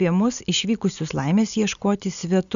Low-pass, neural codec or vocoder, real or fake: 7.2 kHz; none; real